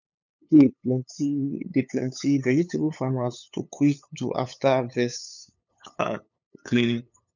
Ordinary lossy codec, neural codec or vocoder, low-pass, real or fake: none; codec, 16 kHz, 8 kbps, FunCodec, trained on LibriTTS, 25 frames a second; 7.2 kHz; fake